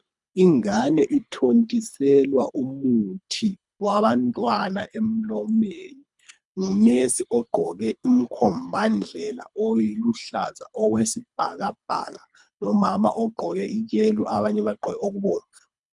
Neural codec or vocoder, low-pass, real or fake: codec, 24 kHz, 3 kbps, HILCodec; 10.8 kHz; fake